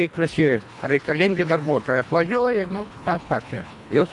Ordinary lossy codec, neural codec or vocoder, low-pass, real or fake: AAC, 64 kbps; codec, 24 kHz, 1.5 kbps, HILCodec; 10.8 kHz; fake